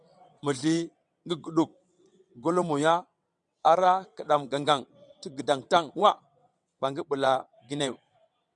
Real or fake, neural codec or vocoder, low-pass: fake; vocoder, 22.05 kHz, 80 mel bands, WaveNeXt; 9.9 kHz